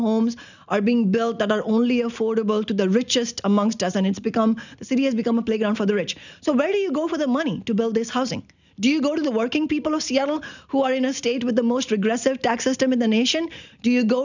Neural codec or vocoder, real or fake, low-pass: none; real; 7.2 kHz